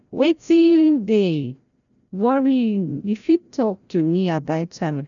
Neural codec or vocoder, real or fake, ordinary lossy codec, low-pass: codec, 16 kHz, 0.5 kbps, FreqCodec, larger model; fake; none; 7.2 kHz